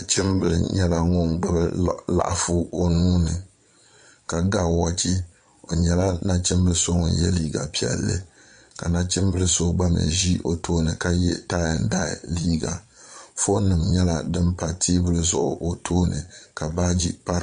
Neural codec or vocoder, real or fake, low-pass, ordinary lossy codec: vocoder, 22.05 kHz, 80 mel bands, Vocos; fake; 9.9 kHz; MP3, 48 kbps